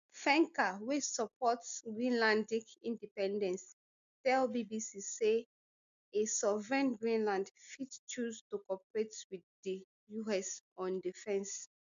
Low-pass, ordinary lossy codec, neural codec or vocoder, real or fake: 7.2 kHz; MP3, 64 kbps; none; real